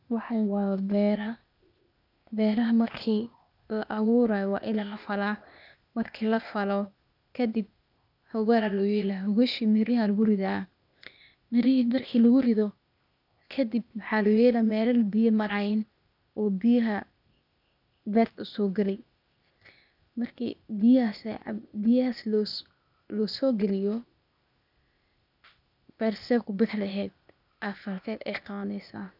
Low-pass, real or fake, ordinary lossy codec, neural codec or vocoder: 5.4 kHz; fake; none; codec, 16 kHz, 0.8 kbps, ZipCodec